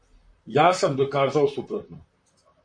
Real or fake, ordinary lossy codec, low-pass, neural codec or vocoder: fake; MP3, 48 kbps; 9.9 kHz; vocoder, 44.1 kHz, 128 mel bands, Pupu-Vocoder